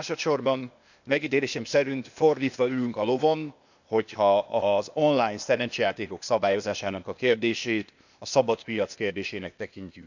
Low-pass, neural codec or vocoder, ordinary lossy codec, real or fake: 7.2 kHz; codec, 16 kHz, 0.8 kbps, ZipCodec; none; fake